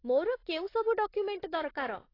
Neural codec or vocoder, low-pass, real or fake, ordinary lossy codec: vocoder, 44.1 kHz, 128 mel bands, Pupu-Vocoder; 5.4 kHz; fake; AAC, 24 kbps